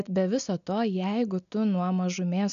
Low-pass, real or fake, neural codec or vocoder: 7.2 kHz; real; none